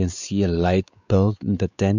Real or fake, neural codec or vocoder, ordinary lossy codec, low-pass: fake; codec, 16 kHz, 4 kbps, X-Codec, WavLM features, trained on Multilingual LibriSpeech; none; 7.2 kHz